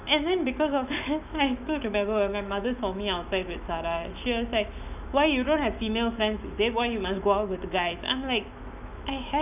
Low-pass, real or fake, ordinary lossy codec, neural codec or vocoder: 3.6 kHz; fake; none; autoencoder, 48 kHz, 128 numbers a frame, DAC-VAE, trained on Japanese speech